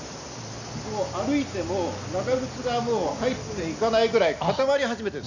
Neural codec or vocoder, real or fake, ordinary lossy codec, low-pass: none; real; none; 7.2 kHz